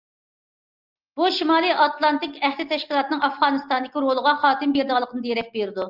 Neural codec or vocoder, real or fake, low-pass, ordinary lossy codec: none; real; 5.4 kHz; Opus, 32 kbps